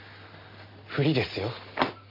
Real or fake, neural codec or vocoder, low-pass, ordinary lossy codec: real; none; 5.4 kHz; MP3, 32 kbps